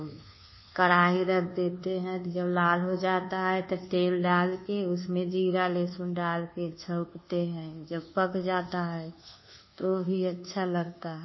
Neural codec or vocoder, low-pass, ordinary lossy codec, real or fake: codec, 24 kHz, 1.2 kbps, DualCodec; 7.2 kHz; MP3, 24 kbps; fake